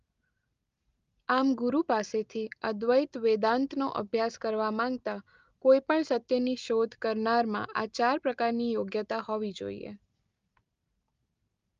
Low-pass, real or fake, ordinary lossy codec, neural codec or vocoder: 7.2 kHz; real; Opus, 32 kbps; none